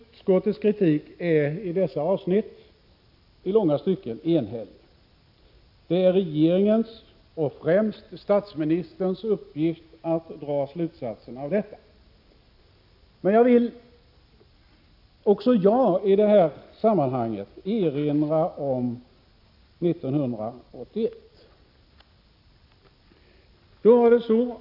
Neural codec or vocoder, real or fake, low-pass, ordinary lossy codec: none; real; 5.4 kHz; AAC, 48 kbps